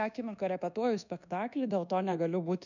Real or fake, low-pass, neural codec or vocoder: fake; 7.2 kHz; codec, 24 kHz, 1.2 kbps, DualCodec